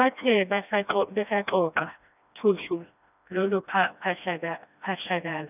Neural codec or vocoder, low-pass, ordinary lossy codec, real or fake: codec, 16 kHz, 1 kbps, FreqCodec, smaller model; 3.6 kHz; none; fake